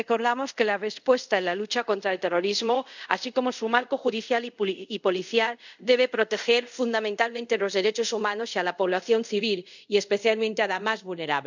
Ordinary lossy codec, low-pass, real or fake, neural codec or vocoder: none; 7.2 kHz; fake; codec, 24 kHz, 0.5 kbps, DualCodec